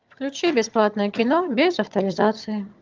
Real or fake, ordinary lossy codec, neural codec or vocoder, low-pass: fake; Opus, 32 kbps; vocoder, 22.05 kHz, 80 mel bands, HiFi-GAN; 7.2 kHz